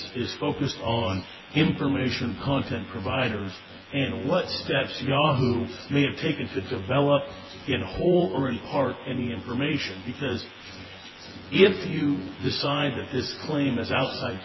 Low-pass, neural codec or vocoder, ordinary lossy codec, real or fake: 7.2 kHz; vocoder, 24 kHz, 100 mel bands, Vocos; MP3, 24 kbps; fake